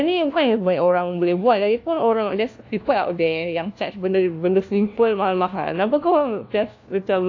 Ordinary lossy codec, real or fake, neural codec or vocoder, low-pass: AAC, 48 kbps; fake; codec, 16 kHz, 1 kbps, FunCodec, trained on LibriTTS, 50 frames a second; 7.2 kHz